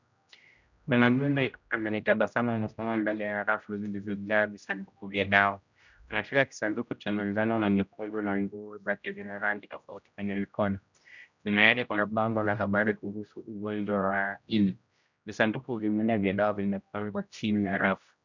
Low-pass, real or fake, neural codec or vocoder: 7.2 kHz; fake; codec, 16 kHz, 0.5 kbps, X-Codec, HuBERT features, trained on general audio